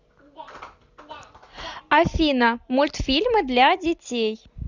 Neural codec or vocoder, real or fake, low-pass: none; real; 7.2 kHz